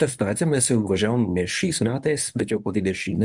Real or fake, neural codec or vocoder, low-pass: fake; codec, 24 kHz, 0.9 kbps, WavTokenizer, medium speech release version 1; 10.8 kHz